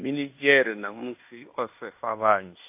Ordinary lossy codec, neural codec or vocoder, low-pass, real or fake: AAC, 32 kbps; codec, 16 kHz in and 24 kHz out, 0.9 kbps, LongCat-Audio-Codec, fine tuned four codebook decoder; 3.6 kHz; fake